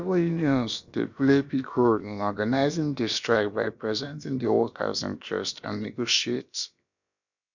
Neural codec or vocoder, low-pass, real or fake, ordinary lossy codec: codec, 16 kHz, about 1 kbps, DyCAST, with the encoder's durations; 7.2 kHz; fake; none